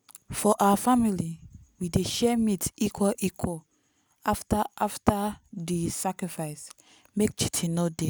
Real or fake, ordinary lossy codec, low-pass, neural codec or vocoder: real; none; none; none